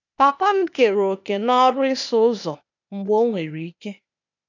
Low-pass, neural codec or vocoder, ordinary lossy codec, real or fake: 7.2 kHz; codec, 16 kHz, 0.8 kbps, ZipCodec; none; fake